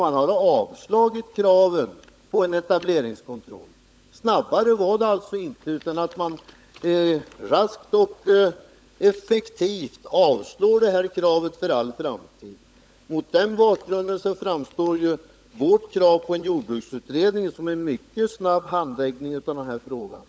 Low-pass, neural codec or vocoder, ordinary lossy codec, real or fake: none; codec, 16 kHz, 16 kbps, FunCodec, trained on LibriTTS, 50 frames a second; none; fake